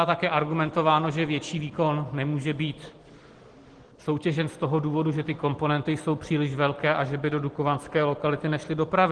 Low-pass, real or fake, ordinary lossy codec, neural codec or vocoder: 9.9 kHz; real; Opus, 16 kbps; none